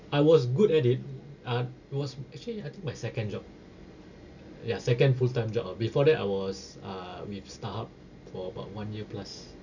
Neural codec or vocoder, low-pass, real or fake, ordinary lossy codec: none; 7.2 kHz; real; none